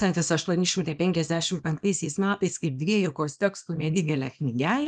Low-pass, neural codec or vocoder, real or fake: 9.9 kHz; codec, 24 kHz, 0.9 kbps, WavTokenizer, small release; fake